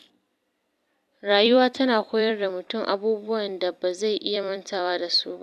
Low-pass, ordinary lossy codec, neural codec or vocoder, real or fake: 14.4 kHz; none; vocoder, 44.1 kHz, 128 mel bands every 256 samples, BigVGAN v2; fake